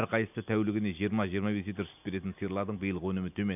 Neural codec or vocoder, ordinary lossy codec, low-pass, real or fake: none; none; 3.6 kHz; real